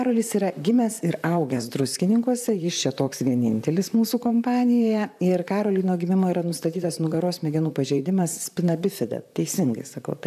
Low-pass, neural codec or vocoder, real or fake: 14.4 kHz; vocoder, 44.1 kHz, 128 mel bands, Pupu-Vocoder; fake